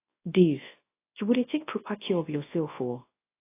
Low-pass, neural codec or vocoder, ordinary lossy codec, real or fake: 3.6 kHz; codec, 24 kHz, 0.9 kbps, WavTokenizer, large speech release; AAC, 24 kbps; fake